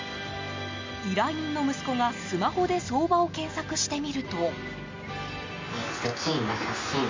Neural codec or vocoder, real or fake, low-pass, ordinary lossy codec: none; real; 7.2 kHz; MP3, 64 kbps